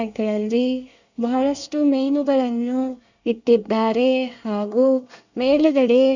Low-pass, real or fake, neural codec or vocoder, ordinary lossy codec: 7.2 kHz; fake; codec, 24 kHz, 1 kbps, SNAC; none